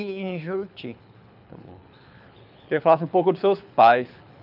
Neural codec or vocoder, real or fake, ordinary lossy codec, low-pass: codec, 24 kHz, 6 kbps, HILCodec; fake; none; 5.4 kHz